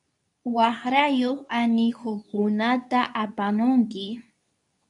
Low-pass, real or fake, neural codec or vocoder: 10.8 kHz; fake; codec, 24 kHz, 0.9 kbps, WavTokenizer, medium speech release version 2